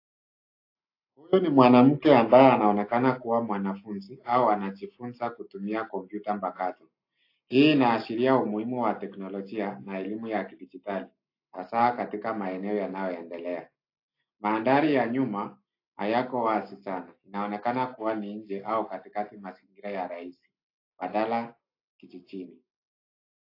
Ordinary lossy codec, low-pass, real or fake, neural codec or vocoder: AAC, 32 kbps; 5.4 kHz; real; none